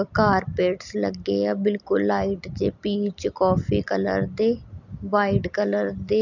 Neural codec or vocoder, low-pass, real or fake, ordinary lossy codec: none; 7.2 kHz; real; none